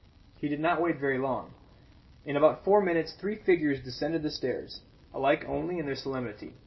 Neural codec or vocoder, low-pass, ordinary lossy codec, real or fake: none; 7.2 kHz; MP3, 24 kbps; real